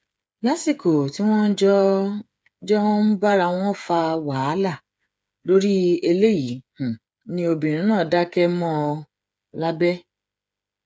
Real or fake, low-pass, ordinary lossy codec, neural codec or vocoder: fake; none; none; codec, 16 kHz, 8 kbps, FreqCodec, smaller model